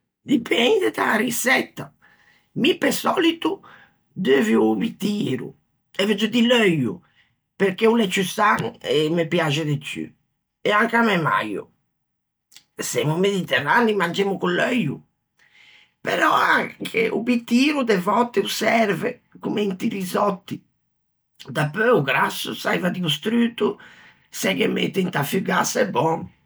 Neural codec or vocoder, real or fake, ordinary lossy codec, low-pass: none; real; none; none